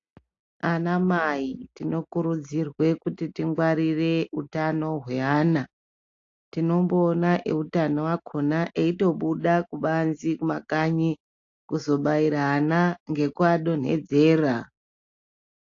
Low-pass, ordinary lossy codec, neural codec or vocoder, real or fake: 7.2 kHz; AAC, 48 kbps; none; real